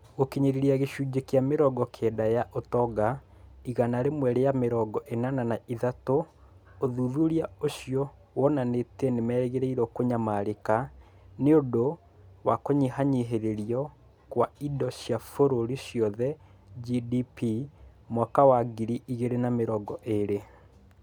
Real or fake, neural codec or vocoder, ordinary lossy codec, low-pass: real; none; none; 19.8 kHz